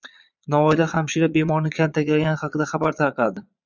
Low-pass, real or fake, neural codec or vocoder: 7.2 kHz; fake; vocoder, 22.05 kHz, 80 mel bands, Vocos